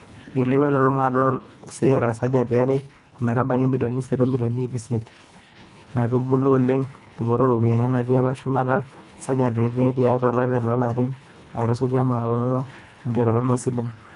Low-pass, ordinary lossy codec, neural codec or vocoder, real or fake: 10.8 kHz; none; codec, 24 kHz, 1.5 kbps, HILCodec; fake